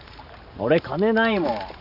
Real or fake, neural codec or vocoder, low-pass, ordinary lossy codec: real; none; 5.4 kHz; none